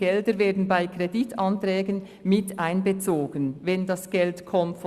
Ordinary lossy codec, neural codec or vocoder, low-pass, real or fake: Opus, 64 kbps; none; 14.4 kHz; real